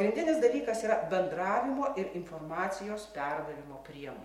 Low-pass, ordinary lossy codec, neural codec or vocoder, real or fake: 19.8 kHz; MP3, 64 kbps; none; real